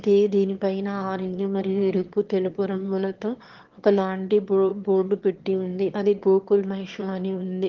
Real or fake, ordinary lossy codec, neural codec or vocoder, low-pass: fake; Opus, 16 kbps; autoencoder, 22.05 kHz, a latent of 192 numbers a frame, VITS, trained on one speaker; 7.2 kHz